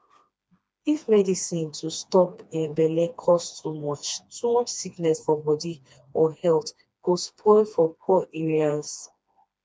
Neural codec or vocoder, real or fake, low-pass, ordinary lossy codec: codec, 16 kHz, 2 kbps, FreqCodec, smaller model; fake; none; none